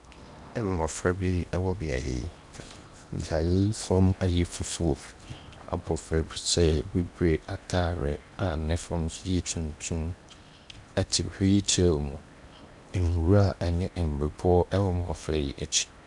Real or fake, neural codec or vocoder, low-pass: fake; codec, 16 kHz in and 24 kHz out, 0.8 kbps, FocalCodec, streaming, 65536 codes; 10.8 kHz